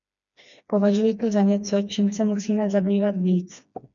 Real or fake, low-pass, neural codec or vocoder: fake; 7.2 kHz; codec, 16 kHz, 2 kbps, FreqCodec, smaller model